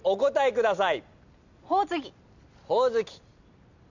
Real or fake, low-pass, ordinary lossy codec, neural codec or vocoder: real; 7.2 kHz; none; none